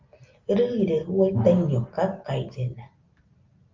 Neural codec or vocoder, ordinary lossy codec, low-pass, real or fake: none; Opus, 32 kbps; 7.2 kHz; real